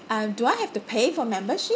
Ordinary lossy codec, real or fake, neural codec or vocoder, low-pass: none; real; none; none